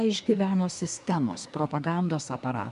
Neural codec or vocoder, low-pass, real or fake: codec, 24 kHz, 1 kbps, SNAC; 10.8 kHz; fake